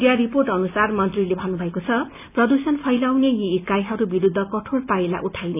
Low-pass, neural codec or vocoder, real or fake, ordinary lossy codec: 3.6 kHz; none; real; none